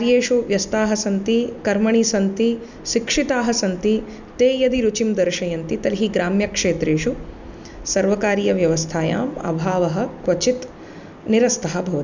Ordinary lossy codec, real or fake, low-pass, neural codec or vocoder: none; real; 7.2 kHz; none